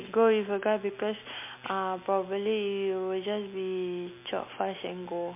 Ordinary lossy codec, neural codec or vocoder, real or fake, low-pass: MP3, 32 kbps; none; real; 3.6 kHz